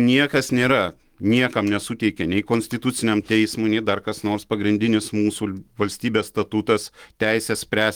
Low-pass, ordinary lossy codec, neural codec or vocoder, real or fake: 19.8 kHz; Opus, 24 kbps; autoencoder, 48 kHz, 128 numbers a frame, DAC-VAE, trained on Japanese speech; fake